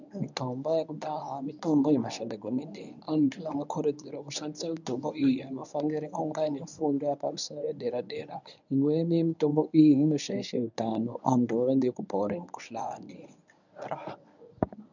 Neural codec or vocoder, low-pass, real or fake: codec, 24 kHz, 0.9 kbps, WavTokenizer, medium speech release version 1; 7.2 kHz; fake